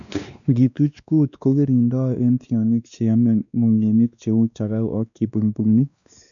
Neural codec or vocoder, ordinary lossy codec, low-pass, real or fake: codec, 16 kHz, 2 kbps, X-Codec, WavLM features, trained on Multilingual LibriSpeech; none; 7.2 kHz; fake